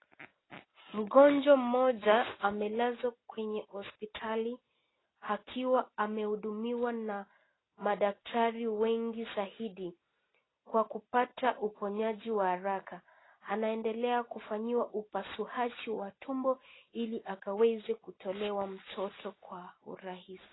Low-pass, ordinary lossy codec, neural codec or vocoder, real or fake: 7.2 kHz; AAC, 16 kbps; none; real